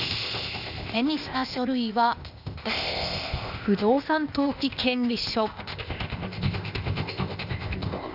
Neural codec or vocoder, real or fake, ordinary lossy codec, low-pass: codec, 16 kHz, 0.8 kbps, ZipCodec; fake; none; 5.4 kHz